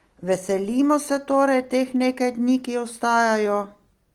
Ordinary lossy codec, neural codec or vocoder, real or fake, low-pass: Opus, 24 kbps; none; real; 19.8 kHz